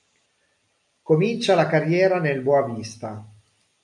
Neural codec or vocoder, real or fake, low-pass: none; real; 10.8 kHz